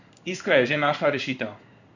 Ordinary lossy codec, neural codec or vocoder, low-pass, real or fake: none; codec, 16 kHz in and 24 kHz out, 1 kbps, XY-Tokenizer; 7.2 kHz; fake